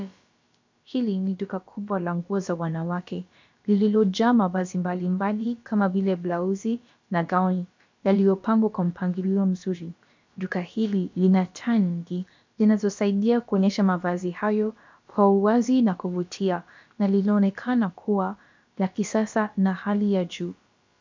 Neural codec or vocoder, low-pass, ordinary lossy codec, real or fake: codec, 16 kHz, about 1 kbps, DyCAST, with the encoder's durations; 7.2 kHz; MP3, 64 kbps; fake